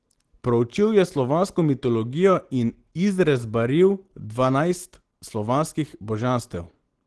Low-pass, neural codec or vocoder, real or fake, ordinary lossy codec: 10.8 kHz; none; real; Opus, 16 kbps